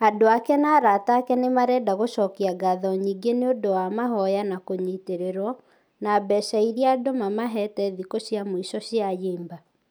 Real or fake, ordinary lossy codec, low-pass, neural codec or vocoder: real; none; none; none